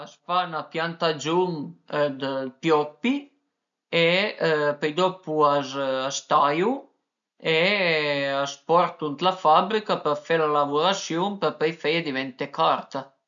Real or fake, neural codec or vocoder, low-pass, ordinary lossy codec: real; none; 7.2 kHz; none